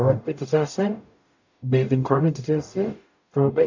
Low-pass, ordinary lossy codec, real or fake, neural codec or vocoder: 7.2 kHz; AAC, 48 kbps; fake; codec, 44.1 kHz, 0.9 kbps, DAC